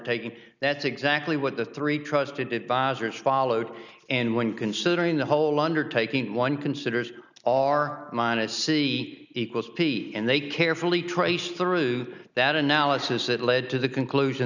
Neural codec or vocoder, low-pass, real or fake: none; 7.2 kHz; real